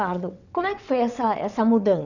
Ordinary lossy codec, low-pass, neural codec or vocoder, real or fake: none; 7.2 kHz; vocoder, 22.05 kHz, 80 mel bands, WaveNeXt; fake